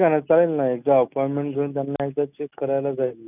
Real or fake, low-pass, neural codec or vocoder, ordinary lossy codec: real; 3.6 kHz; none; none